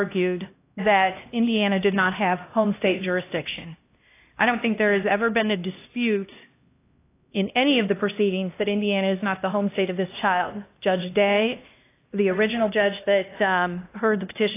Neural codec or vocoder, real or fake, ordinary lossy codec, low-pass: codec, 16 kHz, 1 kbps, X-Codec, HuBERT features, trained on LibriSpeech; fake; AAC, 24 kbps; 3.6 kHz